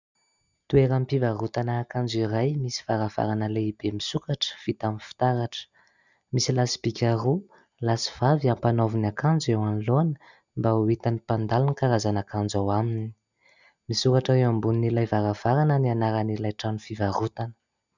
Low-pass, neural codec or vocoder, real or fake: 7.2 kHz; none; real